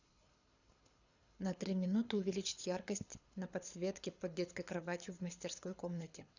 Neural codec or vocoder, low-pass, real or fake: codec, 24 kHz, 6 kbps, HILCodec; 7.2 kHz; fake